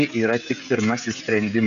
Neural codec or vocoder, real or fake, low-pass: codec, 16 kHz, 16 kbps, FreqCodec, smaller model; fake; 7.2 kHz